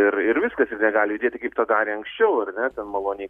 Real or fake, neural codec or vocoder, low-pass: real; none; 14.4 kHz